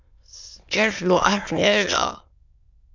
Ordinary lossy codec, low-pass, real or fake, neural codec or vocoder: MP3, 64 kbps; 7.2 kHz; fake; autoencoder, 22.05 kHz, a latent of 192 numbers a frame, VITS, trained on many speakers